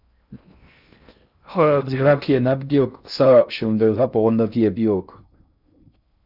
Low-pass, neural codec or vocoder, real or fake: 5.4 kHz; codec, 16 kHz in and 24 kHz out, 0.6 kbps, FocalCodec, streaming, 2048 codes; fake